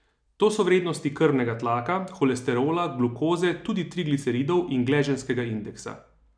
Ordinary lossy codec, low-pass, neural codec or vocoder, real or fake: none; 9.9 kHz; none; real